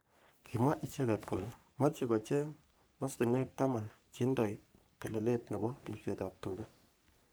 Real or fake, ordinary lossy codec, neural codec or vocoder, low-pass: fake; none; codec, 44.1 kHz, 3.4 kbps, Pupu-Codec; none